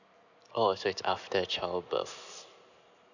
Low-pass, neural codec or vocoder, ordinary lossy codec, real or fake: 7.2 kHz; none; none; real